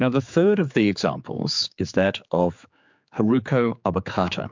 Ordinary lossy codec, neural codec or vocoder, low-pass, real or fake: MP3, 64 kbps; codec, 16 kHz, 4 kbps, X-Codec, HuBERT features, trained on general audio; 7.2 kHz; fake